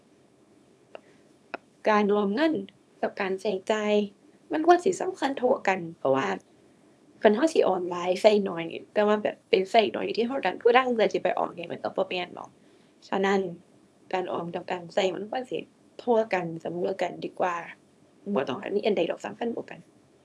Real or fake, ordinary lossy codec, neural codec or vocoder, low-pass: fake; none; codec, 24 kHz, 0.9 kbps, WavTokenizer, small release; none